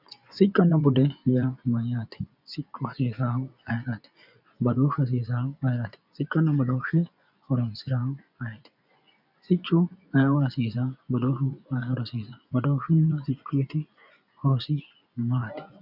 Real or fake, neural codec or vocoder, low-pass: fake; vocoder, 24 kHz, 100 mel bands, Vocos; 5.4 kHz